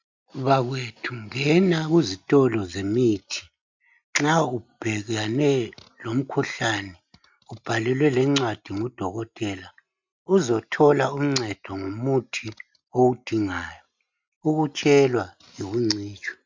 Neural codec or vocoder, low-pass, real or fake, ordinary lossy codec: none; 7.2 kHz; real; MP3, 64 kbps